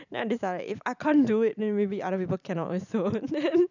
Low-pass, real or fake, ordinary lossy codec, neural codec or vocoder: 7.2 kHz; fake; none; vocoder, 44.1 kHz, 128 mel bands every 512 samples, BigVGAN v2